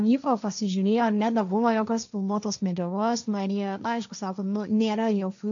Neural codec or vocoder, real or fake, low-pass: codec, 16 kHz, 1.1 kbps, Voila-Tokenizer; fake; 7.2 kHz